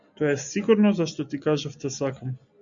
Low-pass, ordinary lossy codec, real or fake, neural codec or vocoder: 7.2 kHz; MP3, 64 kbps; real; none